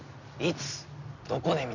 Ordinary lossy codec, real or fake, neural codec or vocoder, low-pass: none; real; none; 7.2 kHz